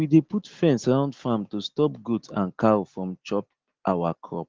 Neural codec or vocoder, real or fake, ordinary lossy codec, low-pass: none; real; Opus, 16 kbps; 7.2 kHz